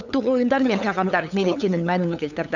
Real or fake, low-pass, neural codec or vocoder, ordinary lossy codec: fake; 7.2 kHz; codec, 16 kHz, 8 kbps, FunCodec, trained on LibriTTS, 25 frames a second; none